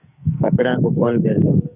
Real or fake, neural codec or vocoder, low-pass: fake; codec, 44.1 kHz, 2.6 kbps, SNAC; 3.6 kHz